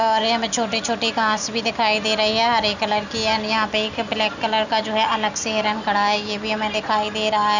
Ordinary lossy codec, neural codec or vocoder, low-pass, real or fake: none; none; 7.2 kHz; real